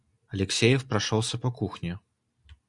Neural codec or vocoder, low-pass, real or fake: none; 10.8 kHz; real